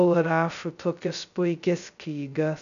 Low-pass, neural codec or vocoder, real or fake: 7.2 kHz; codec, 16 kHz, 0.2 kbps, FocalCodec; fake